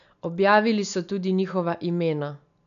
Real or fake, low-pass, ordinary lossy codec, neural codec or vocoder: real; 7.2 kHz; none; none